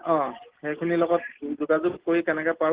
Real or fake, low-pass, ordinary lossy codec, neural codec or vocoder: real; 3.6 kHz; Opus, 16 kbps; none